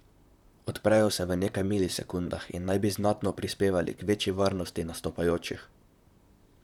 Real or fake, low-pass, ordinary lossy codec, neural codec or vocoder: fake; 19.8 kHz; none; vocoder, 44.1 kHz, 128 mel bands, Pupu-Vocoder